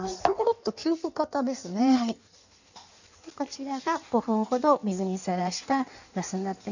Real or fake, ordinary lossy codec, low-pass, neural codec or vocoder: fake; none; 7.2 kHz; codec, 16 kHz in and 24 kHz out, 1.1 kbps, FireRedTTS-2 codec